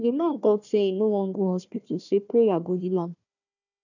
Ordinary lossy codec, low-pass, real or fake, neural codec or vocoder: none; 7.2 kHz; fake; codec, 16 kHz, 1 kbps, FunCodec, trained on Chinese and English, 50 frames a second